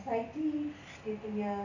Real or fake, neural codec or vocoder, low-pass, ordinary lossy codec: real; none; 7.2 kHz; none